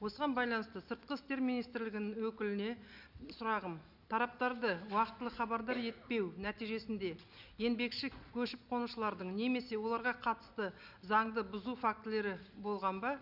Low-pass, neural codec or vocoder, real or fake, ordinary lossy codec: 5.4 kHz; none; real; none